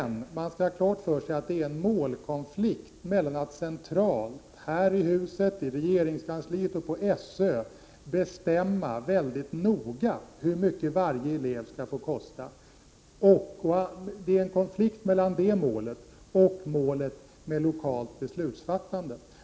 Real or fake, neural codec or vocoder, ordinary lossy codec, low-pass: real; none; none; none